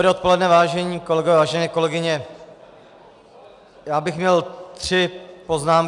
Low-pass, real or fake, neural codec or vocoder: 10.8 kHz; real; none